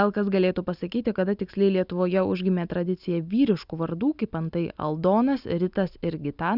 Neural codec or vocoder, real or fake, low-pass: none; real; 5.4 kHz